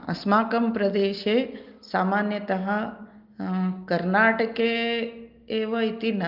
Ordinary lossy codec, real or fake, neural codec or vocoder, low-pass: Opus, 32 kbps; real; none; 5.4 kHz